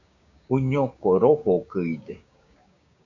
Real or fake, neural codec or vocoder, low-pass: fake; codec, 16 kHz, 6 kbps, DAC; 7.2 kHz